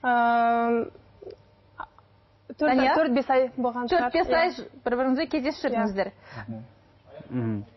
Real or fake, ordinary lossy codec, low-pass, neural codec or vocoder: real; MP3, 24 kbps; 7.2 kHz; none